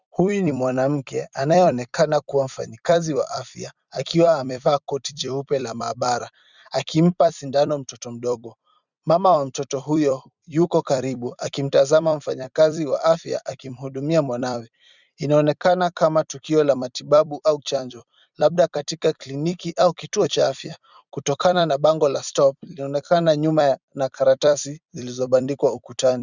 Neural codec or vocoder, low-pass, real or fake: vocoder, 44.1 kHz, 128 mel bands every 256 samples, BigVGAN v2; 7.2 kHz; fake